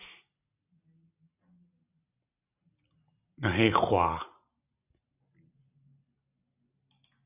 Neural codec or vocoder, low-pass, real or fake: none; 3.6 kHz; real